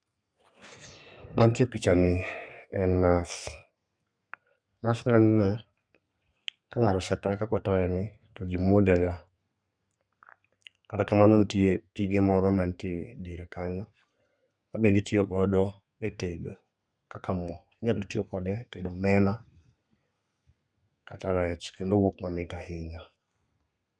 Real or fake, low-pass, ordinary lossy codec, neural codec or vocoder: fake; 9.9 kHz; none; codec, 32 kHz, 1.9 kbps, SNAC